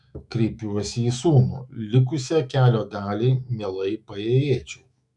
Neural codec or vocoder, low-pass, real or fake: autoencoder, 48 kHz, 128 numbers a frame, DAC-VAE, trained on Japanese speech; 10.8 kHz; fake